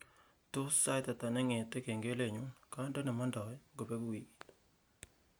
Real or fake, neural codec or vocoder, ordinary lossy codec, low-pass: real; none; none; none